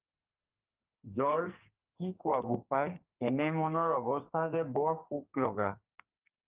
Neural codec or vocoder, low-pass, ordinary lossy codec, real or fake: codec, 44.1 kHz, 2.6 kbps, SNAC; 3.6 kHz; Opus, 32 kbps; fake